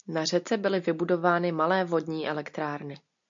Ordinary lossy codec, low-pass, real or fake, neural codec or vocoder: MP3, 64 kbps; 7.2 kHz; real; none